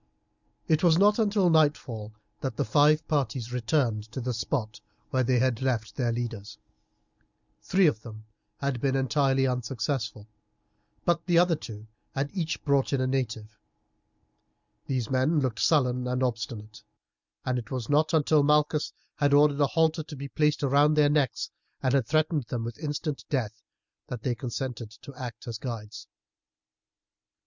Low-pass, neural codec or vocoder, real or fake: 7.2 kHz; none; real